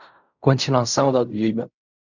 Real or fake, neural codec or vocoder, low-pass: fake; codec, 16 kHz in and 24 kHz out, 0.4 kbps, LongCat-Audio-Codec, fine tuned four codebook decoder; 7.2 kHz